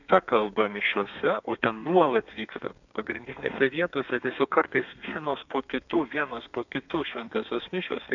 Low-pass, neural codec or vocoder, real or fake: 7.2 kHz; codec, 32 kHz, 1.9 kbps, SNAC; fake